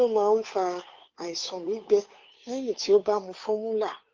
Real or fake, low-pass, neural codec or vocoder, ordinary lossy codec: fake; 7.2 kHz; codec, 16 kHz, 2 kbps, FunCodec, trained on Chinese and English, 25 frames a second; Opus, 24 kbps